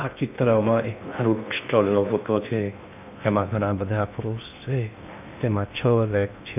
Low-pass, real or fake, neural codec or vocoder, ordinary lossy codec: 3.6 kHz; fake; codec, 16 kHz in and 24 kHz out, 0.6 kbps, FocalCodec, streaming, 2048 codes; none